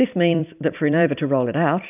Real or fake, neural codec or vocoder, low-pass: fake; vocoder, 44.1 kHz, 128 mel bands every 256 samples, BigVGAN v2; 3.6 kHz